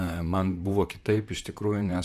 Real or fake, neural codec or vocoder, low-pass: fake; vocoder, 44.1 kHz, 128 mel bands, Pupu-Vocoder; 14.4 kHz